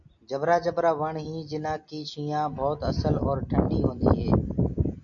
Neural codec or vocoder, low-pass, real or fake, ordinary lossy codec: none; 7.2 kHz; real; MP3, 48 kbps